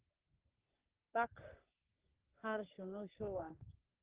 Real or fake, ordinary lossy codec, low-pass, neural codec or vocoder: fake; Opus, 16 kbps; 3.6 kHz; codec, 44.1 kHz, 3.4 kbps, Pupu-Codec